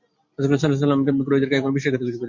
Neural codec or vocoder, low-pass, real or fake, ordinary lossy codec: none; 7.2 kHz; real; MP3, 48 kbps